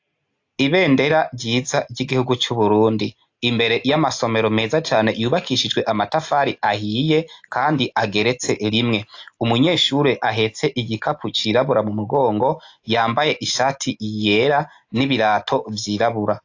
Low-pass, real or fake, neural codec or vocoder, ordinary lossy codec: 7.2 kHz; real; none; AAC, 48 kbps